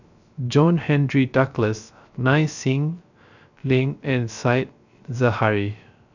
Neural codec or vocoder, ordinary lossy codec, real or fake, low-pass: codec, 16 kHz, 0.3 kbps, FocalCodec; none; fake; 7.2 kHz